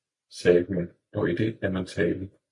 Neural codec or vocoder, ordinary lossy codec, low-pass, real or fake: none; MP3, 64 kbps; 10.8 kHz; real